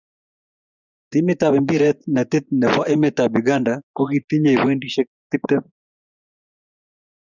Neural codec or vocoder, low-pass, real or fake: vocoder, 24 kHz, 100 mel bands, Vocos; 7.2 kHz; fake